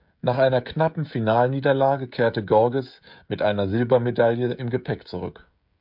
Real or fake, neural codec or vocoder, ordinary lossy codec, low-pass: fake; codec, 16 kHz, 16 kbps, FreqCodec, smaller model; MP3, 48 kbps; 5.4 kHz